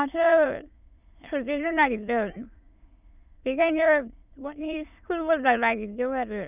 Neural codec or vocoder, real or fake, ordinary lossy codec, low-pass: autoencoder, 22.05 kHz, a latent of 192 numbers a frame, VITS, trained on many speakers; fake; none; 3.6 kHz